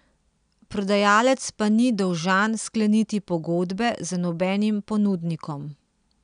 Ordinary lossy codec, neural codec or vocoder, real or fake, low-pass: none; none; real; 9.9 kHz